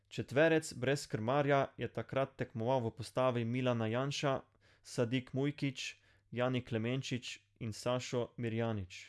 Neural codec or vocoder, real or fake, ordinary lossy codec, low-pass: none; real; none; none